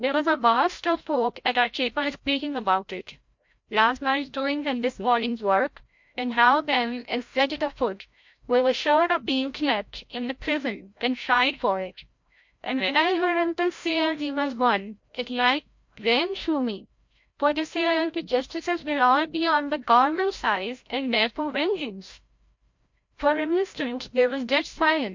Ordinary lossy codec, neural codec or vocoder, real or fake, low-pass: MP3, 48 kbps; codec, 16 kHz, 0.5 kbps, FreqCodec, larger model; fake; 7.2 kHz